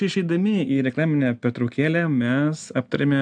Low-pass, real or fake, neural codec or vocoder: 9.9 kHz; real; none